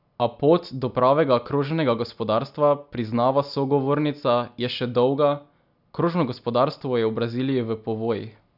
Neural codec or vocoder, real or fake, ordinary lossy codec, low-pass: none; real; none; 5.4 kHz